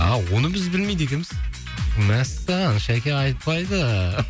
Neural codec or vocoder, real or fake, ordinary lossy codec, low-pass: none; real; none; none